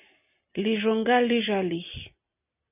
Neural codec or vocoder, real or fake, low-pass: none; real; 3.6 kHz